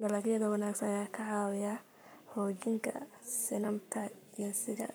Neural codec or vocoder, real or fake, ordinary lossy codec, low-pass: codec, 44.1 kHz, 7.8 kbps, Pupu-Codec; fake; none; none